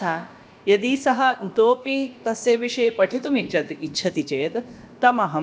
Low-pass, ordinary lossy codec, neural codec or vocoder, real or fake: none; none; codec, 16 kHz, about 1 kbps, DyCAST, with the encoder's durations; fake